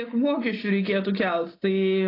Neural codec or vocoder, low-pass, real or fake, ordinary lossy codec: none; 5.4 kHz; real; AAC, 24 kbps